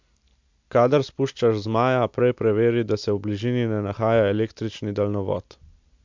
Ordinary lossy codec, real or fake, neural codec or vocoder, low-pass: MP3, 64 kbps; real; none; 7.2 kHz